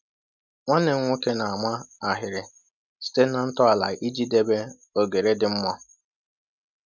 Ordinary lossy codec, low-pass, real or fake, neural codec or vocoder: none; 7.2 kHz; real; none